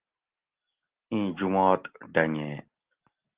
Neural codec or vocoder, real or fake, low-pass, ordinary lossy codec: none; real; 3.6 kHz; Opus, 16 kbps